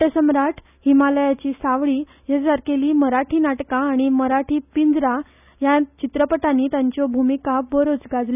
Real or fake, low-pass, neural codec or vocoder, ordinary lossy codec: real; 3.6 kHz; none; none